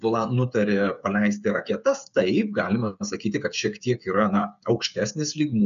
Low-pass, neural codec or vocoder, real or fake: 7.2 kHz; codec, 16 kHz, 8 kbps, FreqCodec, smaller model; fake